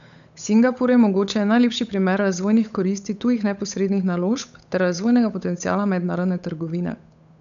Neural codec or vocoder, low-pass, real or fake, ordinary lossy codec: codec, 16 kHz, 8 kbps, FunCodec, trained on Chinese and English, 25 frames a second; 7.2 kHz; fake; none